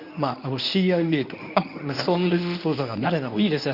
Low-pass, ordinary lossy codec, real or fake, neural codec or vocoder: 5.4 kHz; none; fake; codec, 24 kHz, 0.9 kbps, WavTokenizer, medium speech release version 1